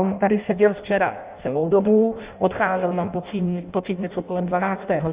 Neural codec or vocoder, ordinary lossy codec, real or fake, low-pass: codec, 16 kHz in and 24 kHz out, 0.6 kbps, FireRedTTS-2 codec; Opus, 64 kbps; fake; 3.6 kHz